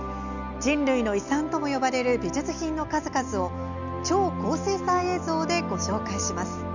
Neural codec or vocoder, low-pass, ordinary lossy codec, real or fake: none; 7.2 kHz; none; real